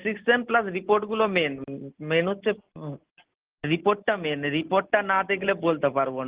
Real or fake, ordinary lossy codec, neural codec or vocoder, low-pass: real; Opus, 16 kbps; none; 3.6 kHz